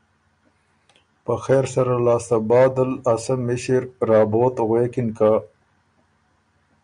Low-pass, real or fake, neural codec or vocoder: 9.9 kHz; real; none